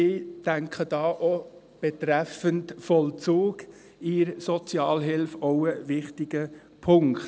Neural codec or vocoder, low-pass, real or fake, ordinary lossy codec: none; none; real; none